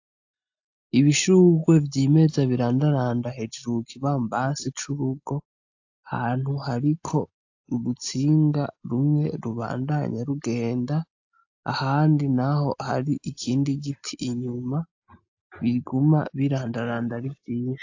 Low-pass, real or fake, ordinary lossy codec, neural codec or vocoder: 7.2 kHz; real; AAC, 48 kbps; none